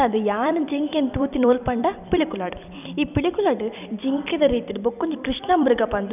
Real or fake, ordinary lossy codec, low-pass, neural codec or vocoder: fake; none; 3.6 kHz; vocoder, 44.1 kHz, 128 mel bands every 256 samples, BigVGAN v2